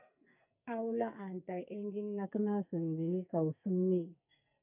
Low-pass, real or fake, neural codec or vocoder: 3.6 kHz; fake; codec, 44.1 kHz, 2.6 kbps, SNAC